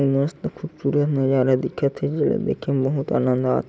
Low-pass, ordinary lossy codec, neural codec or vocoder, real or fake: none; none; none; real